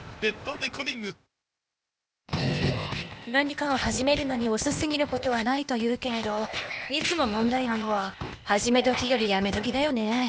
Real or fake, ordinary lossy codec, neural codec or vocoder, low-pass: fake; none; codec, 16 kHz, 0.8 kbps, ZipCodec; none